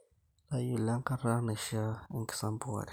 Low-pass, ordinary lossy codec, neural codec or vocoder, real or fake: none; none; none; real